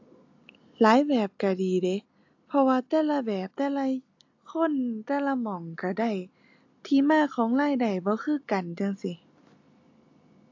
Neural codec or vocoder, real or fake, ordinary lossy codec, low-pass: none; real; AAC, 48 kbps; 7.2 kHz